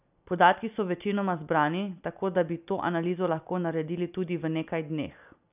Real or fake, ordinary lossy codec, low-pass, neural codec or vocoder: real; none; 3.6 kHz; none